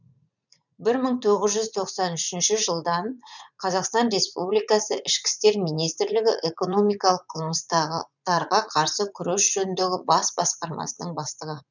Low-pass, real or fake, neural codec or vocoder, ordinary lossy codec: 7.2 kHz; fake; vocoder, 44.1 kHz, 80 mel bands, Vocos; none